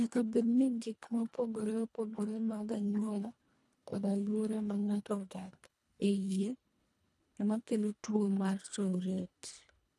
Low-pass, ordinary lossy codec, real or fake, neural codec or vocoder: none; none; fake; codec, 24 kHz, 1.5 kbps, HILCodec